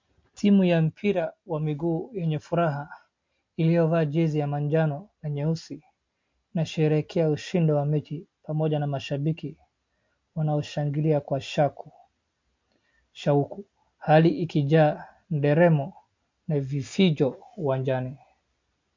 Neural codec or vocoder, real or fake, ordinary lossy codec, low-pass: none; real; MP3, 48 kbps; 7.2 kHz